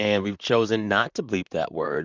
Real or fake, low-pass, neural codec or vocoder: fake; 7.2 kHz; vocoder, 44.1 kHz, 128 mel bands, Pupu-Vocoder